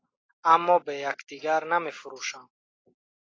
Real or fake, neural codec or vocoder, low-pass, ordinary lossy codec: real; none; 7.2 kHz; AAC, 32 kbps